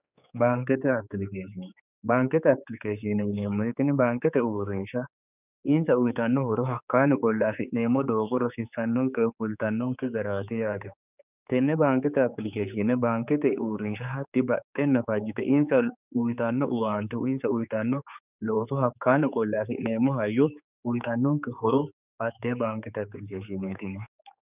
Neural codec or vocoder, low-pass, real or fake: codec, 16 kHz, 4 kbps, X-Codec, HuBERT features, trained on general audio; 3.6 kHz; fake